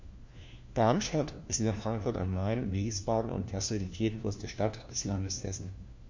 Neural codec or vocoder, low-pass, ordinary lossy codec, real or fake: codec, 16 kHz, 1 kbps, FunCodec, trained on LibriTTS, 50 frames a second; 7.2 kHz; MP3, 48 kbps; fake